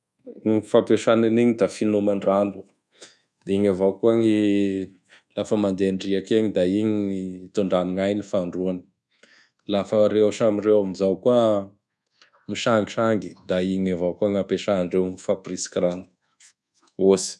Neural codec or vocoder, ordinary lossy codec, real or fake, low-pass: codec, 24 kHz, 1.2 kbps, DualCodec; none; fake; none